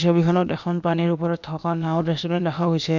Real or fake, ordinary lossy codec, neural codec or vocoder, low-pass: fake; none; codec, 16 kHz, about 1 kbps, DyCAST, with the encoder's durations; 7.2 kHz